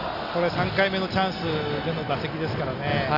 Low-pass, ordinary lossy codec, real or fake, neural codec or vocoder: 5.4 kHz; none; real; none